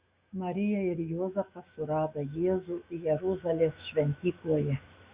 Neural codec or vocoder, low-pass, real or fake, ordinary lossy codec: autoencoder, 48 kHz, 128 numbers a frame, DAC-VAE, trained on Japanese speech; 3.6 kHz; fake; Opus, 64 kbps